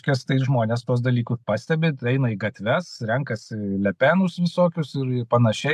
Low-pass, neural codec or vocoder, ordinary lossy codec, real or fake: 14.4 kHz; none; AAC, 96 kbps; real